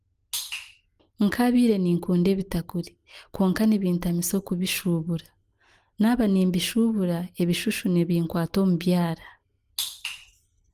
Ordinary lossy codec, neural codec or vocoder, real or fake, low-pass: Opus, 24 kbps; none; real; 14.4 kHz